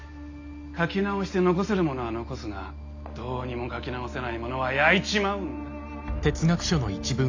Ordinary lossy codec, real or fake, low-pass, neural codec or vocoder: AAC, 48 kbps; real; 7.2 kHz; none